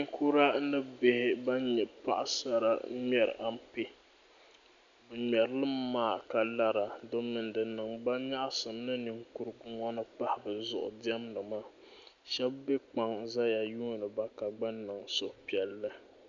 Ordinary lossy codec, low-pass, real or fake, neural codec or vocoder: MP3, 64 kbps; 7.2 kHz; real; none